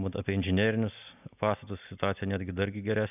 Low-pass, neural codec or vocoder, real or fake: 3.6 kHz; none; real